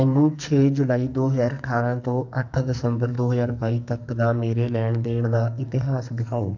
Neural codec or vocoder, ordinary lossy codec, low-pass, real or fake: codec, 44.1 kHz, 2.6 kbps, SNAC; none; 7.2 kHz; fake